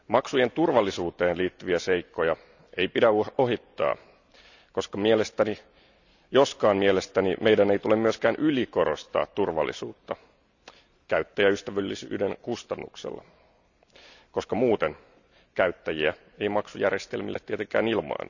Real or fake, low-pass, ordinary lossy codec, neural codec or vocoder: real; 7.2 kHz; none; none